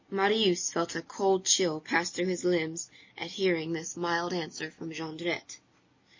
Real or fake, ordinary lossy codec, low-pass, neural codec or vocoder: real; MP3, 32 kbps; 7.2 kHz; none